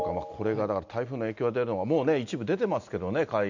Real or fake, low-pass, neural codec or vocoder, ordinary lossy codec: real; 7.2 kHz; none; none